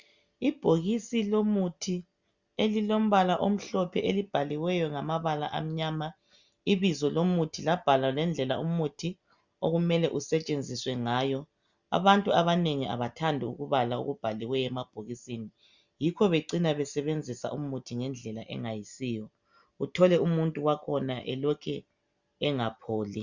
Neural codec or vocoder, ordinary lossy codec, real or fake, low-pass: none; Opus, 64 kbps; real; 7.2 kHz